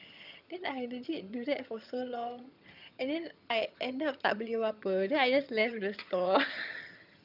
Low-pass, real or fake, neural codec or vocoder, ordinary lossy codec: 5.4 kHz; fake; vocoder, 22.05 kHz, 80 mel bands, HiFi-GAN; none